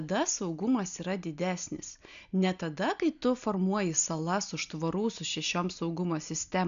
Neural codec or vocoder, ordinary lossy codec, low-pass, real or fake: none; MP3, 96 kbps; 7.2 kHz; real